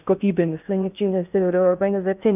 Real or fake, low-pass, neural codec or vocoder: fake; 3.6 kHz; codec, 16 kHz in and 24 kHz out, 0.6 kbps, FocalCodec, streaming, 2048 codes